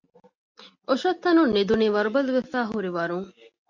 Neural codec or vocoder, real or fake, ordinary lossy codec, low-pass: none; real; MP3, 48 kbps; 7.2 kHz